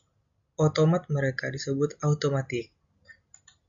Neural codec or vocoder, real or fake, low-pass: none; real; 7.2 kHz